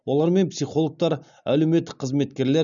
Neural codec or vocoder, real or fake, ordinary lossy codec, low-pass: none; real; none; 7.2 kHz